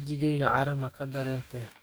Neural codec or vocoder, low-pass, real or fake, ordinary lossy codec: codec, 44.1 kHz, 2.6 kbps, DAC; none; fake; none